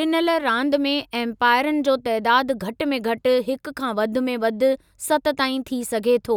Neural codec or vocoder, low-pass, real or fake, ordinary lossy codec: none; 19.8 kHz; real; none